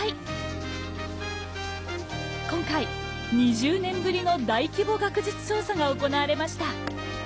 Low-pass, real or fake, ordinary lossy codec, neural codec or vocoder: none; real; none; none